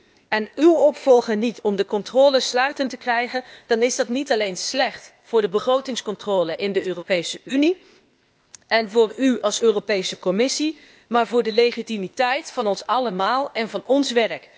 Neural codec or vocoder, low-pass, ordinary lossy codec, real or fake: codec, 16 kHz, 0.8 kbps, ZipCodec; none; none; fake